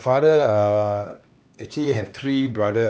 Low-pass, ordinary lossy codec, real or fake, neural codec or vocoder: none; none; fake; codec, 16 kHz, 2 kbps, X-Codec, HuBERT features, trained on LibriSpeech